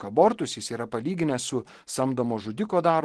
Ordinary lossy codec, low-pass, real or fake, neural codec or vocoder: Opus, 16 kbps; 10.8 kHz; real; none